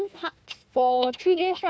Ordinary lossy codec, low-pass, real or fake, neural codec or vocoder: none; none; fake; codec, 16 kHz, 1 kbps, FunCodec, trained on Chinese and English, 50 frames a second